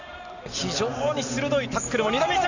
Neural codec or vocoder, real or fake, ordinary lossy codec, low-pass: none; real; none; 7.2 kHz